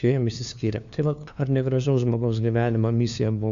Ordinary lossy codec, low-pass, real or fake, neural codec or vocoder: Opus, 64 kbps; 7.2 kHz; fake; codec, 16 kHz, 2 kbps, FunCodec, trained on LibriTTS, 25 frames a second